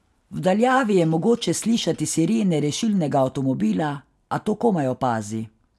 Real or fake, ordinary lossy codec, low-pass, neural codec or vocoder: real; none; none; none